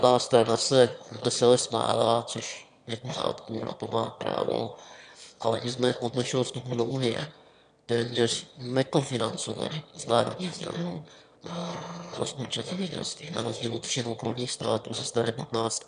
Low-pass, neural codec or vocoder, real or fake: 9.9 kHz; autoencoder, 22.05 kHz, a latent of 192 numbers a frame, VITS, trained on one speaker; fake